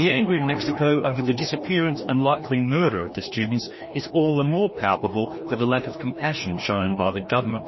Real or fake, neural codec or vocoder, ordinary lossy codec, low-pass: fake; codec, 16 kHz, 1 kbps, FreqCodec, larger model; MP3, 24 kbps; 7.2 kHz